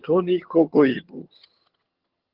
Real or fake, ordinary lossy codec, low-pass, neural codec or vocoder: fake; Opus, 16 kbps; 5.4 kHz; vocoder, 22.05 kHz, 80 mel bands, HiFi-GAN